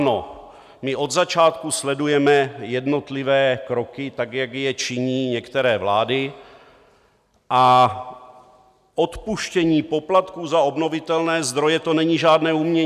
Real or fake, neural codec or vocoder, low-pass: real; none; 14.4 kHz